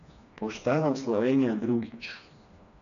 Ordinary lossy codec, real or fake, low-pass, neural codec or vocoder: none; fake; 7.2 kHz; codec, 16 kHz, 2 kbps, FreqCodec, smaller model